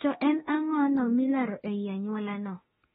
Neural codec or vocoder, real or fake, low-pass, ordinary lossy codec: autoencoder, 48 kHz, 32 numbers a frame, DAC-VAE, trained on Japanese speech; fake; 19.8 kHz; AAC, 16 kbps